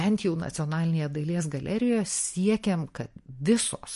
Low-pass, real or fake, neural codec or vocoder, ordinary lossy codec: 14.4 kHz; real; none; MP3, 48 kbps